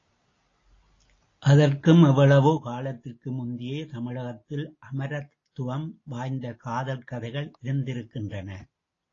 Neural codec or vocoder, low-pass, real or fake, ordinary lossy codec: none; 7.2 kHz; real; AAC, 32 kbps